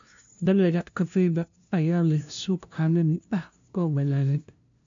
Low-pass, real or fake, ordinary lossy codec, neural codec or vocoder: 7.2 kHz; fake; MP3, 64 kbps; codec, 16 kHz, 0.5 kbps, FunCodec, trained on LibriTTS, 25 frames a second